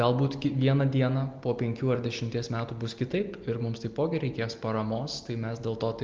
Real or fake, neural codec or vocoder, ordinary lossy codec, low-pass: real; none; Opus, 32 kbps; 7.2 kHz